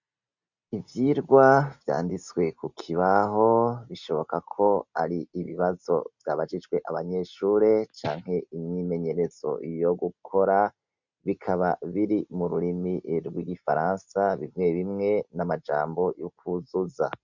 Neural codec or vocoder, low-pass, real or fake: none; 7.2 kHz; real